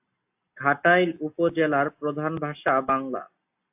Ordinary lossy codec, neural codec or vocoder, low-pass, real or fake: AAC, 32 kbps; none; 3.6 kHz; real